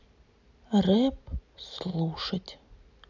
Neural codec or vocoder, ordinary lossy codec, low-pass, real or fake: none; none; 7.2 kHz; real